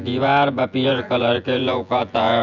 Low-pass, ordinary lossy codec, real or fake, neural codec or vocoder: 7.2 kHz; none; fake; vocoder, 24 kHz, 100 mel bands, Vocos